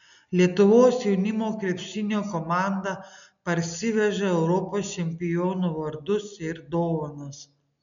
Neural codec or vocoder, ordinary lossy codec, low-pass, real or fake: none; MP3, 96 kbps; 7.2 kHz; real